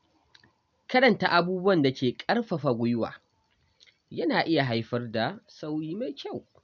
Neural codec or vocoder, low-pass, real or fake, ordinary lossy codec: none; 7.2 kHz; real; none